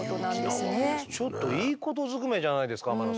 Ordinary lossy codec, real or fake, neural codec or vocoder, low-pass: none; real; none; none